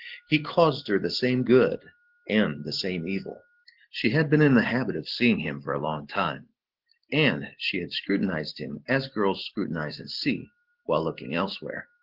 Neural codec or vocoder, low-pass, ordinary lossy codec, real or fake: none; 5.4 kHz; Opus, 16 kbps; real